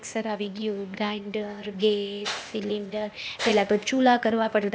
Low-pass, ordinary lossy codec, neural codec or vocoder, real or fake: none; none; codec, 16 kHz, 0.8 kbps, ZipCodec; fake